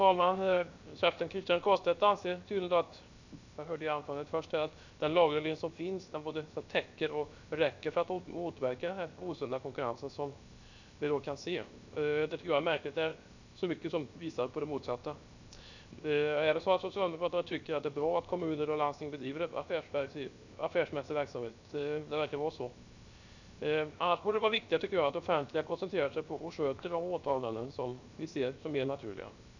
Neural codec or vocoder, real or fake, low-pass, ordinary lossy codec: codec, 16 kHz, 0.7 kbps, FocalCodec; fake; 7.2 kHz; none